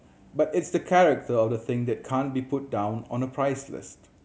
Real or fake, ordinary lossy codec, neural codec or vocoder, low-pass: real; none; none; none